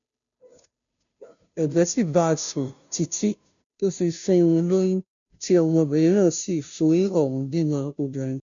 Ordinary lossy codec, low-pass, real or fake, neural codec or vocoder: none; 7.2 kHz; fake; codec, 16 kHz, 0.5 kbps, FunCodec, trained on Chinese and English, 25 frames a second